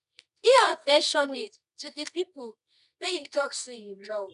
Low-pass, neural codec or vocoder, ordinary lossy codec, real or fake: 10.8 kHz; codec, 24 kHz, 0.9 kbps, WavTokenizer, medium music audio release; none; fake